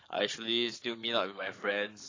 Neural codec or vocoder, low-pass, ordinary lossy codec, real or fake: codec, 44.1 kHz, 7.8 kbps, Pupu-Codec; 7.2 kHz; AAC, 32 kbps; fake